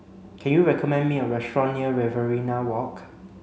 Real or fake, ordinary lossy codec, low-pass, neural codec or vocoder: real; none; none; none